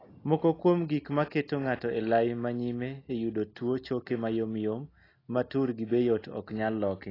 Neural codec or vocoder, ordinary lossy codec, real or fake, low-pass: none; AAC, 24 kbps; real; 5.4 kHz